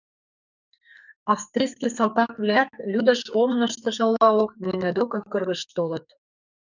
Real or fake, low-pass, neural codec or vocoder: fake; 7.2 kHz; codec, 44.1 kHz, 2.6 kbps, SNAC